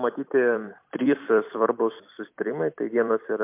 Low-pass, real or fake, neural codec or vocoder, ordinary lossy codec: 3.6 kHz; fake; vocoder, 44.1 kHz, 128 mel bands every 256 samples, BigVGAN v2; MP3, 32 kbps